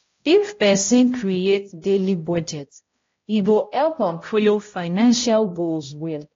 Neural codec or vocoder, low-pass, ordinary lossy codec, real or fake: codec, 16 kHz, 0.5 kbps, X-Codec, HuBERT features, trained on balanced general audio; 7.2 kHz; AAC, 32 kbps; fake